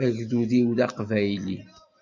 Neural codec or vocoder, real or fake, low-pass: none; real; 7.2 kHz